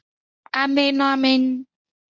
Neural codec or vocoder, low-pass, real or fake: codec, 24 kHz, 0.9 kbps, WavTokenizer, medium speech release version 1; 7.2 kHz; fake